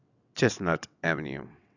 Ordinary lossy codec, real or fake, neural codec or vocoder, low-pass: none; fake; vocoder, 22.05 kHz, 80 mel bands, WaveNeXt; 7.2 kHz